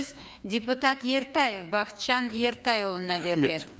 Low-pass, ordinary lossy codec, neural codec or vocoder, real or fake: none; none; codec, 16 kHz, 2 kbps, FreqCodec, larger model; fake